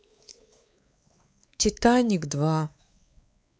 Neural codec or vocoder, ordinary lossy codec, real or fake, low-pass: codec, 16 kHz, 4 kbps, X-Codec, WavLM features, trained on Multilingual LibriSpeech; none; fake; none